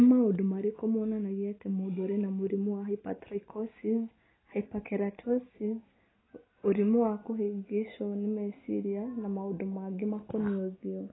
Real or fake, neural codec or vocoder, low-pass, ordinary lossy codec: real; none; 7.2 kHz; AAC, 16 kbps